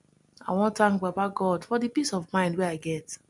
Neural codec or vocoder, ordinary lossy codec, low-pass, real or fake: none; MP3, 96 kbps; 10.8 kHz; real